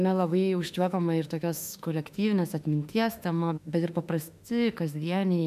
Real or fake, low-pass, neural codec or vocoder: fake; 14.4 kHz; autoencoder, 48 kHz, 32 numbers a frame, DAC-VAE, trained on Japanese speech